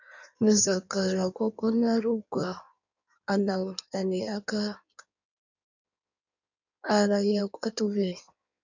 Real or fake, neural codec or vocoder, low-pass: fake; codec, 16 kHz in and 24 kHz out, 1.1 kbps, FireRedTTS-2 codec; 7.2 kHz